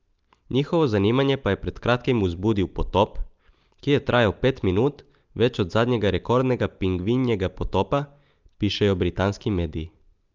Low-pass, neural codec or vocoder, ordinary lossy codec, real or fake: 7.2 kHz; none; Opus, 24 kbps; real